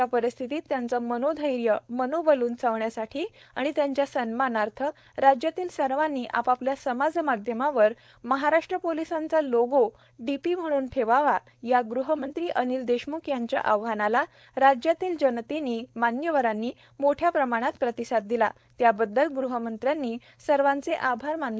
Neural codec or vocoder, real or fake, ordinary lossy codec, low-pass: codec, 16 kHz, 4.8 kbps, FACodec; fake; none; none